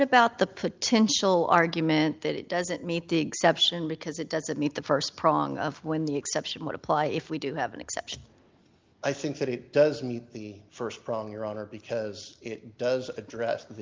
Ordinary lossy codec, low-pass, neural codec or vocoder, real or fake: Opus, 32 kbps; 7.2 kHz; none; real